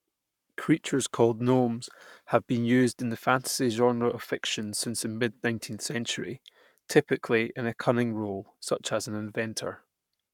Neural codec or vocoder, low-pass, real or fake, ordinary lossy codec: codec, 44.1 kHz, 7.8 kbps, Pupu-Codec; 19.8 kHz; fake; none